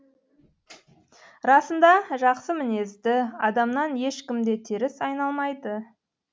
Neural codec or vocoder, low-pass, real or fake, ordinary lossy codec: none; none; real; none